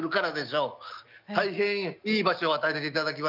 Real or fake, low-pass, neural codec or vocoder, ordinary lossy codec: real; 5.4 kHz; none; none